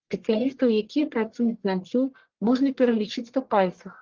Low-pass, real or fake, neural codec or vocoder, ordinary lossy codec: 7.2 kHz; fake; codec, 44.1 kHz, 1.7 kbps, Pupu-Codec; Opus, 16 kbps